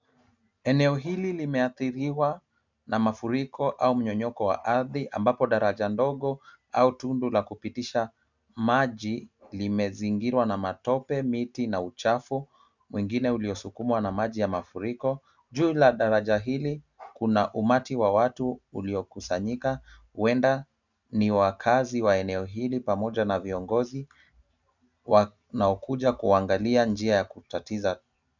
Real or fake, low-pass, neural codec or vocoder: real; 7.2 kHz; none